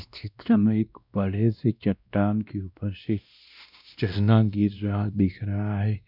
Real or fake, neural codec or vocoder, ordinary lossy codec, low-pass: fake; codec, 16 kHz, 1 kbps, X-Codec, WavLM features, trained on Multilingual LibriSpeech; none; 5.4 kHz